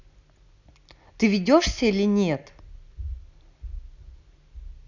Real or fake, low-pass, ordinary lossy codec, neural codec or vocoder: real; 7.2 kHz; none; none